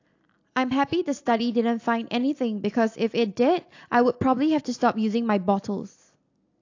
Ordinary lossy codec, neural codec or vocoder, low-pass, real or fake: AAC, 48 kbps; none; 7.2 kHz; real